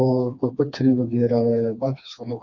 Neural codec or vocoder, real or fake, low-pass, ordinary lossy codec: codec, 16 kHz, 2 kbps, FreqCodec, smaller model; fake; 7.2 kHz; none